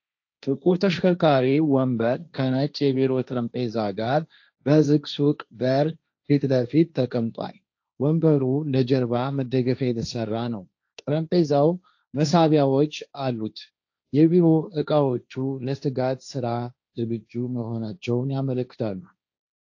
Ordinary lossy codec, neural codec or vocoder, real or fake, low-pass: AAC, 48 kbps; codec, 16 kHz, 1.1 kbps, Voila-Tokenizer; fake; 7.2 kHz